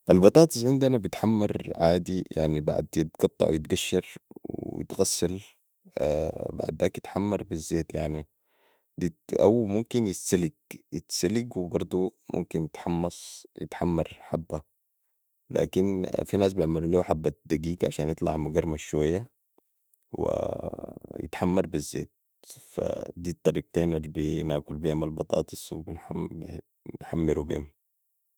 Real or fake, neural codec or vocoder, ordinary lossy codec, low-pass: fake; autoencoder, 48 kHz, 32 numbers a frame, DAC-VAE, trained on Japanese speech; none; none